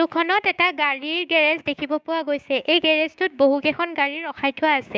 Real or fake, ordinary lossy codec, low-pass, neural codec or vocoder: fake; none; none; codec, 16 kHz, 6 kbps, DAC